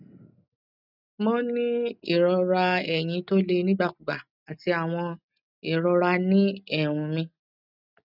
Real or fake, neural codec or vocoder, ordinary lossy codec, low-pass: real; none; none; 5.4 kHz